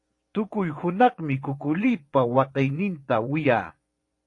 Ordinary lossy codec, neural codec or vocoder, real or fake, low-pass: AAC, 48 kbps; vocoder, 24 kHz, 100 mel bands, Vocos; fake; 10.8 kHz